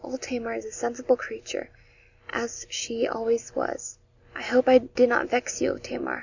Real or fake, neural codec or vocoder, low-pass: real; none; 7.2 kHz